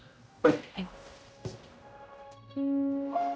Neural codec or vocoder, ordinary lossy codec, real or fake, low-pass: codec, 16 kHz, 0.5 kbps, X-Codec, HuBERT features, trained on general audio; none; fake; none